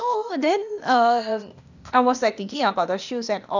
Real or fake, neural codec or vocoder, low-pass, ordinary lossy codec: fake; codec, 16 kHz, 0.8 kbps, ZipCodec; 7.2 kHz; none